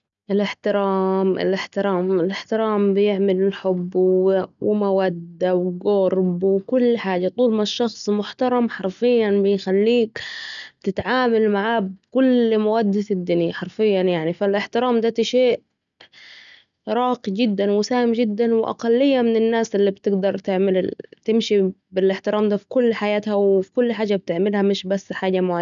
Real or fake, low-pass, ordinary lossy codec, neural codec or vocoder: real; 7.2 kHz; none; none